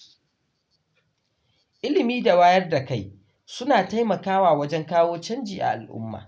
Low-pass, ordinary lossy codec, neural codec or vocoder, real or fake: none; none; none; real